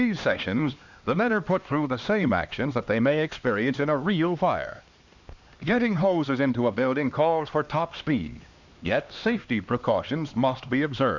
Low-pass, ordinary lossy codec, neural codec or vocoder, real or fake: 7.2 kHz; Opus, 64 kbps; codec, 16 kHz, 2 kbps, X-Codec, HuBERT features, trained on LibriSpeech; fake